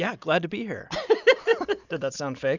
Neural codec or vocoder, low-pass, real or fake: none; 7.2 kHz; real